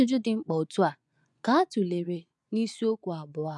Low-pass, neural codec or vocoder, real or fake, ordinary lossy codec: 10.8 kHz; vocoder, 24 kHz, 100 mel bands, Vocos; fake; none